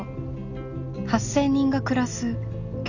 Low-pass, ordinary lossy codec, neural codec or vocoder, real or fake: 7.2 kHz; none; none; real